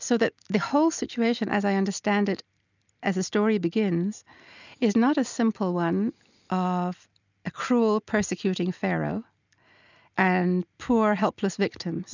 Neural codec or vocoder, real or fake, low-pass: none; real; 7.2 kHz